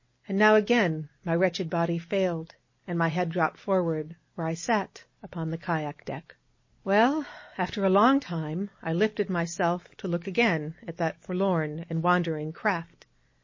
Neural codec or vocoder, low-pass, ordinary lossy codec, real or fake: none; 7.2 kHz; MP3, 32 kbps; real